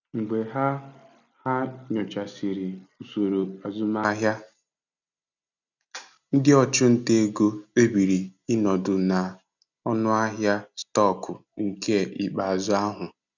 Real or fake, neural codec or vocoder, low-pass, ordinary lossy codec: real; none; 7.2 kHz; none